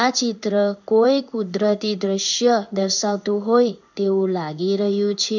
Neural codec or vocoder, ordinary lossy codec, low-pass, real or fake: codec, 16 kHz in and 24 kHz out, 1 kbps, XY-Tokenizer; none; 7.2 kHz; fake